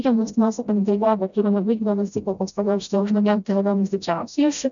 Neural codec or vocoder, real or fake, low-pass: codec, 16 kHz, 0.5 kbps, FreqCodec, smaller model; fake; 7.2 kHz